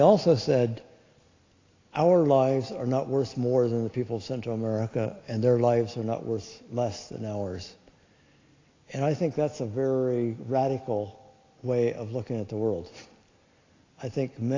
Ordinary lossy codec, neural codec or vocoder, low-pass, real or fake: AAC, 32 kbps; none; 7.2 kHz; real